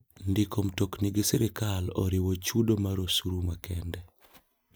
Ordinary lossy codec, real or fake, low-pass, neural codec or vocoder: none; real; none; none